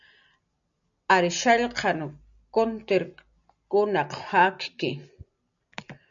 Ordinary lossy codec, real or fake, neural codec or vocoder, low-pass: MP3, 96 kbps; real; none; 7.2 kHz